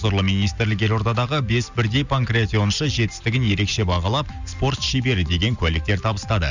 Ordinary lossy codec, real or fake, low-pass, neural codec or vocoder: none; real; 7.2 kHz; none